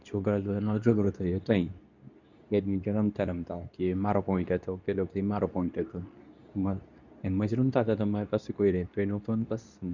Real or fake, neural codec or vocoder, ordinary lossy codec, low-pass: fake; codec, 24 kHz, 0.9 kbps, WavTokenizer, medium speech release version 2; none; 7.2 kHz